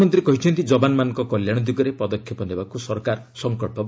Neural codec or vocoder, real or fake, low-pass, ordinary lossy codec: none; real; none; none